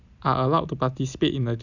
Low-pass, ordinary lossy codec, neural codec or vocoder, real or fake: 7.2 kHz; none; none; real